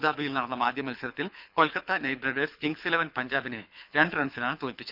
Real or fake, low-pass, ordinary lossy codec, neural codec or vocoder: fake; 5.4 kHz; none; codec, 24 kHz, 6 kbps, HILCodec